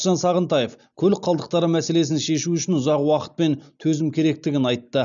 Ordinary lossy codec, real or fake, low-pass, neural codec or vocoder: none; real; 7.2 kHz; none